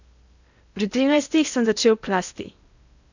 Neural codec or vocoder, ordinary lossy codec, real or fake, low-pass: codec, 16 kHz in and 24 kHz out, 0.8 kbps, FocalCodec, streaming, 65536 codes; none; fake; 7.2 kHz